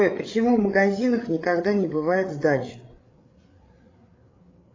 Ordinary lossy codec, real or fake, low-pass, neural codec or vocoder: AAC, 32 kbps; fake; 7.2 kHz; codec, 16 kHz, 8 kbps, FreqCodec, larger model